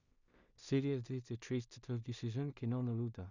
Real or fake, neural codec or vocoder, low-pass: fake; codec, 16 kHz in and 24 kHz out, 0.4 kbps, LongCat-Audio-Codec, two codebook decoder; 7.2 kHz